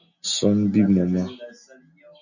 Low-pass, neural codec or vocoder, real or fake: 7.2 kHz; none; real